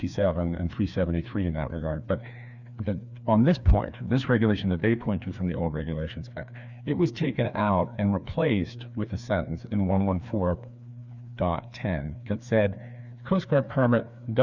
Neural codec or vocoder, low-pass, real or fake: codec, 16 kHz, 2 kbps, FreqCodec, larger model; 7.2 kHz; fake